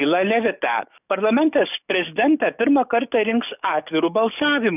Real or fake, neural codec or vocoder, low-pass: fake; codec, 44.1 kHz, 7.8 kbps, DAC; 3.6 kHz